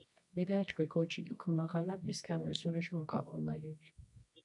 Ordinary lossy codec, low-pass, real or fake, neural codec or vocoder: MP3, 96 kbps; 10.8 kHz; fake; codec, 24 kHz, 0.9 kbps, WavTokenizer, medium music audio release